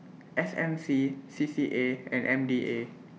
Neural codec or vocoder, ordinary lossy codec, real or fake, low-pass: none; none; real; none